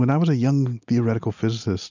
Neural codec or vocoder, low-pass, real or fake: none; 7.2 kHz; real